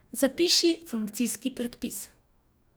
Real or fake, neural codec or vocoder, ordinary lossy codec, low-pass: fake; codec, 44.1 kHz, 2.6 kbps, DAC; none; none